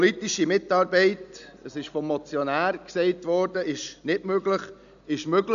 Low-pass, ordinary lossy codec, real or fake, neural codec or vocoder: 7.2 kHz; none; real; none